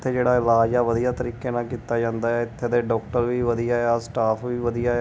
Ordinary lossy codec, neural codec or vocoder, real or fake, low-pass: none; none; real; none